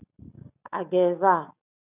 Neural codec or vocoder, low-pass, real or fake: none; 3.6 kHz; real